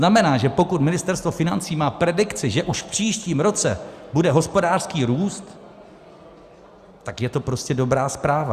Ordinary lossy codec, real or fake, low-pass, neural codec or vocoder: Opus, 64 kbps; real; 14.4 kHz; none